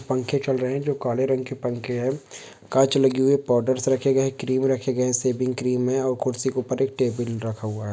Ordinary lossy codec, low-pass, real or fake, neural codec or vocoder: none; none; real; none